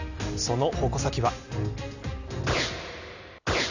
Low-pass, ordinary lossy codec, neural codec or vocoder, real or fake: 7.2 kHz; none; none; real